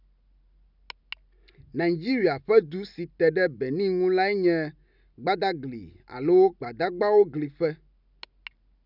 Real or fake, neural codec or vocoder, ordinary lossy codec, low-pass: real; none; none; 5.4 kHz